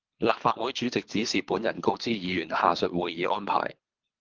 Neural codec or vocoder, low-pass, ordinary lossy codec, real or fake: codec, 24 kHz, 3 kbps, HILCodec; 7.2 kHz; Opus, 24 kbps; fake